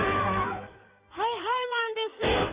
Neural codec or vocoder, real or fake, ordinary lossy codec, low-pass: codec, 16 kHz in and 24 kHz out, 1.1 kbps, FireRedTTS-2 codec; fake; Opus, 64 kbps; 3.6 kHz